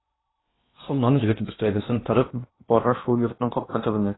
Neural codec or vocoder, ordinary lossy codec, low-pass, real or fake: codec, 16 kHz in and 24 kHz out, 0.8 kbps, FocalCodec, streaming, 65536 codes; AAC, 16 kbps; 7.2 kHz; fake